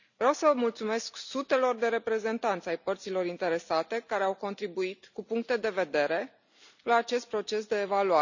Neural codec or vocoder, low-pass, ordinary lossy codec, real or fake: none; 7.2 kHz; none; real